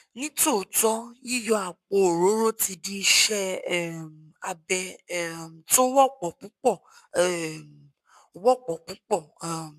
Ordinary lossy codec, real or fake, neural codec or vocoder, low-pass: MP3, 96 kbps; fake; codec, 44.1 kHz, 7.8 kbps, Pupu-Codec; 14.4 kHz